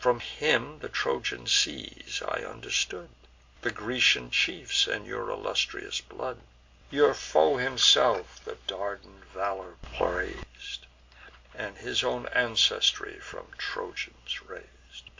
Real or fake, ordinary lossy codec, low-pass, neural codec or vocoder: real; MP3, 48 kbps; 7.2 kHz; none